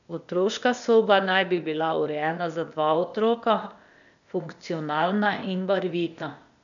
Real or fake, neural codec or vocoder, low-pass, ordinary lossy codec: fake; codec, 16 kHz, 0.8 kbps, ZipCodec; 7.2 kHz; none